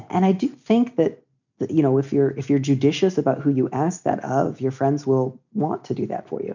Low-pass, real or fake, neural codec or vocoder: 7.2 kHz; real; none